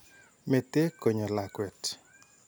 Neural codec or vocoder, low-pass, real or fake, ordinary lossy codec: none; none; real; none